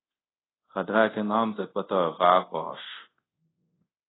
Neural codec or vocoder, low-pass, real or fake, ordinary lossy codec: codec, 24 kHz, 0.5 kbps, DualCodec; 7.2 kHz; fake; AAC, 16 kbps